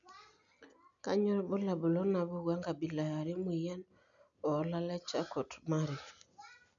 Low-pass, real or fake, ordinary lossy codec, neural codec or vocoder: 7.2 kHz; real; none; none